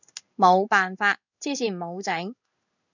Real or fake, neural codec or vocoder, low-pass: fake; codec, 16 kHz in and 24 kHz out, 1 kbps, XY-Tokenizer; 7.2 kHz